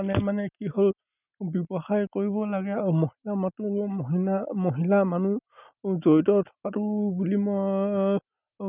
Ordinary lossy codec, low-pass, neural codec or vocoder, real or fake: none; 3.6 kHz; none; real